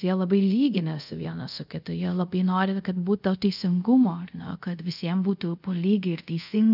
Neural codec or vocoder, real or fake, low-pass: codec, 24 kHz, 0.5 kbps, DualCodec; fake; 5.4 kHz